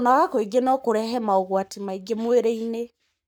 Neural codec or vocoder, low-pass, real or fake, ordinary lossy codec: codec, 44.1 kHz, 7.8 kbps, Pupu-Codec; none; fake; none